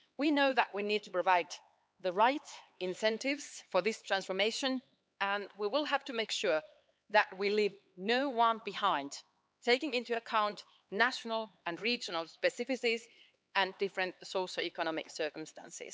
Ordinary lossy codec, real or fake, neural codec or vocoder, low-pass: none; fake; codec, 16 kHz, 4 kbps, X-Codec, HuBERT features, trained on LibriSpeech; none